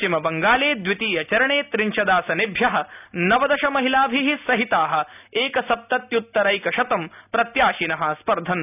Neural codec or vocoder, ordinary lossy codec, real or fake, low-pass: none; none; real; 3.6 kHz